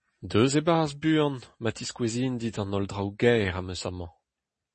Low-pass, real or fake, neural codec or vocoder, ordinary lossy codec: 10.8 kHz; real; none; MP3, 32 kbps